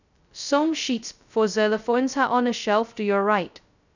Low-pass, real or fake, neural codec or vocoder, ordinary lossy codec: 7.2 kHz; fake; codec, 16 kHz, 0.2 kbps, FocalCodec; none